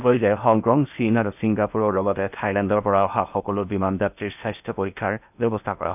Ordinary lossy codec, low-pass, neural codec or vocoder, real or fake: none; 3.6 kHz; codec, 16 kHz in and 24 kHz out, 0.8 kbps, FocalCodec, streaming, 65536 codes; fake